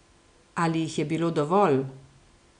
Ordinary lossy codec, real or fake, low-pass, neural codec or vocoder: none; real; 9.9 kHz; none